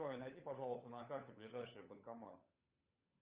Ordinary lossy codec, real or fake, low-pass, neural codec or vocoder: Opus, 32 kbps; fake; 3.6 kHz; codec, 16 kHz, 16 kbps, FunCodec, trained on LibriTTS, 50 frames a second